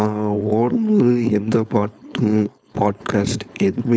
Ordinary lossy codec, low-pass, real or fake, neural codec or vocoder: none; none; fake; codec, 16 kHz, 4.8 kbps, FACodec